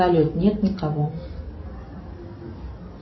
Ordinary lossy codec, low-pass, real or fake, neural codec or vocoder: MP3, 24 kbps; 7.2 kHz; real; none